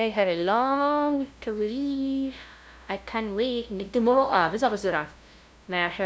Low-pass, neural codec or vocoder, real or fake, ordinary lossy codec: none; codec, 16 kHz, 0.5 kbps, FunCodec, trained on LibriTTS, 25 frames a second; fake; none